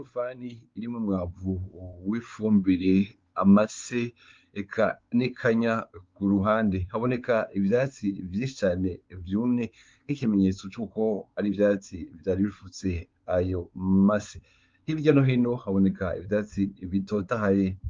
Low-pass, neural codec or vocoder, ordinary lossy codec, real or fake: 7.2 kHz; codec, 16 kHz, 4 kbps, X-Codec, WavLM features, trained on Multilingual LibriSpeech; Opus, 32 kbps; fake